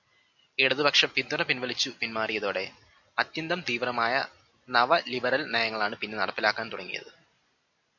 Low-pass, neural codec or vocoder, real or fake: 7.2 kHz; none; real